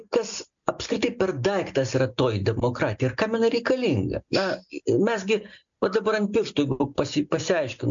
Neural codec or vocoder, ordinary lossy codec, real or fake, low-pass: none; MP3, 64 kbps; real; 7.2 kHz